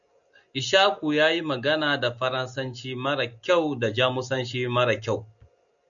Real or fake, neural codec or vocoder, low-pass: real; none; 7.2 kHz